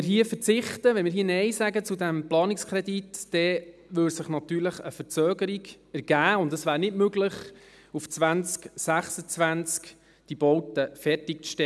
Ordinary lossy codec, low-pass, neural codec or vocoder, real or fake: none; none; none; real